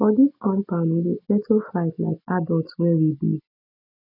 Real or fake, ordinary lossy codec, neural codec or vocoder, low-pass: real; none; none; 5.4 kHz